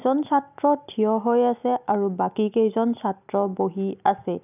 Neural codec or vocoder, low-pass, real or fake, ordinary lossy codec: none; 3.6 kHz; real; none